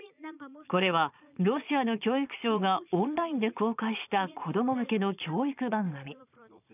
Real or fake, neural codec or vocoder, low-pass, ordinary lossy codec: fake; vocoder, 22.05 kHz, 80 mel bands, Vocos; 3.6 kHz; none